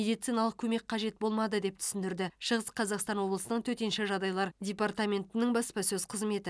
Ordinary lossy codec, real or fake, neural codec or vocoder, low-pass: none; real; none; none